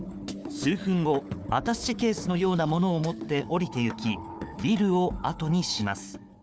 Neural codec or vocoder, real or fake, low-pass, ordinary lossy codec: codec, 16 kHz, 4 kbps, FunCodec, trained on Chinese and English, 50 frames a second; fake; none; none